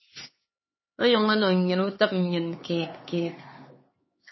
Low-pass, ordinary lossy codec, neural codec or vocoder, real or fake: 7.2 kHz; MP3, 24 kbps; codec, 16 kHz, 4 kbps, X-Codec, HuBERT features, trained on LibriSpeech; fake